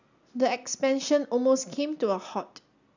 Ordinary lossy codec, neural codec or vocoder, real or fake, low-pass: none; none; real; 7.2 kHz